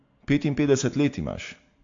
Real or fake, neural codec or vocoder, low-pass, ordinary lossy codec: real; none; 7.2 kHz; AAC, 48 kbps